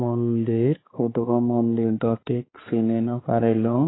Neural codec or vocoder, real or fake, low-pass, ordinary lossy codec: codec, 16 kHz, 4 kbps, X-Codec, HuBERT features, trained on balanced general audio; fake; 7.2 kHz; AAC, 16 kbps